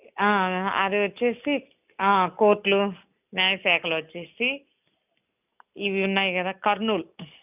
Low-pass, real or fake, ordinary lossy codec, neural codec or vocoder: 3.6 kHz; real; none; none